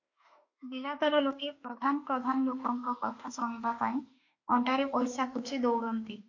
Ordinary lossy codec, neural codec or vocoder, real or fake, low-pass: MP3, 48 kbps; autoencoder, 48 kHz, 32 numbers a frame, DAC-VAE, trained on Japanese speech; fake; 7.2 kHz